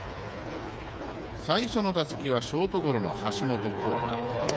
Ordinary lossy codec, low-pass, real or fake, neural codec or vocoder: none; none; fake; codec, 16 kHz, 4 kbps, FreqCodec, smaller model